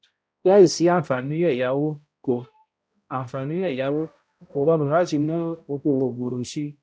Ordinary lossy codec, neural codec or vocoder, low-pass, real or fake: none; codec, 16 kHz, 0.5 kbps, X-Codec, HuBERT features, trained on balanced general audio; none; fake